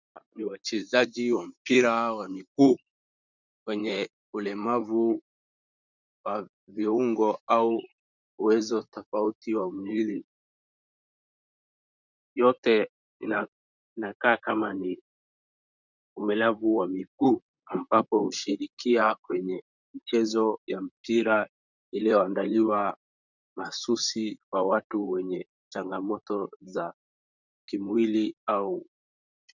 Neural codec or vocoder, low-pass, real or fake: vocoder, 44.1 kHz, 128 mel bands, Pupu-Vocoder; 7.2 kHz; fake